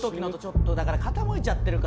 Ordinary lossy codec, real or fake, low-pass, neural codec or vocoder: none; real; none; none